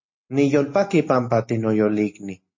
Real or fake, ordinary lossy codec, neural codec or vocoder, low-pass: real; MP3, 32 kbps; none; 7.2 kHz